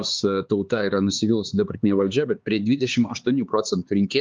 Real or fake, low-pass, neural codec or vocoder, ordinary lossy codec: fake; 7.2 kHz; codec, 16 kHz, 4 kbps, X-Codec, HuBERT features, trained on LibriSpeech; Opus, 32 kbps